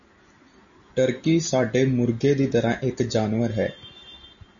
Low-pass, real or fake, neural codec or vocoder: 7.2 kHz; real; none